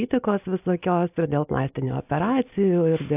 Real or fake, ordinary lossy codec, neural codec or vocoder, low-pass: real; AAC, 32 kbps; none; 3.6 kHz